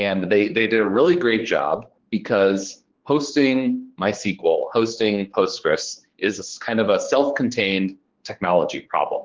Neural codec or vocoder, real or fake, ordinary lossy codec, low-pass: codec, 16 kHz, 4 kbps, X-Codec, HuBERT features, trained on general audio; fake; Opus, 16 kbps; 7.2 kHz